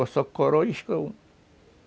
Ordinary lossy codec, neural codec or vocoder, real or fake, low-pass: none; none; real; none